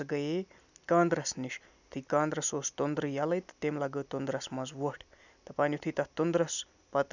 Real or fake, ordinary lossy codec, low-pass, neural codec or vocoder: real; none; 7.2 kHz; none